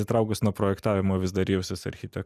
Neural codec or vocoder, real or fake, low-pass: none; real; 14.4 kHz